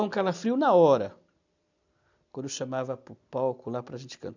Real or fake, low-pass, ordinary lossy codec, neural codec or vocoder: real; 7.2 kHz; none; none